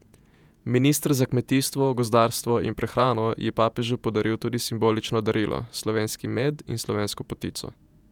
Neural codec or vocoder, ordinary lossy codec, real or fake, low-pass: vocoder, 48 kHz, 128 mel bands, Vocos; none; fake; 19.8 kHz